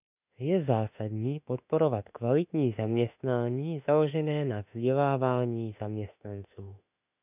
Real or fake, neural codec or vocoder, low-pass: fake; autoencoder, 48 kHz, 32 numbers a frame, DAC-VAE, trained on Japanese speech; 3.6 kHz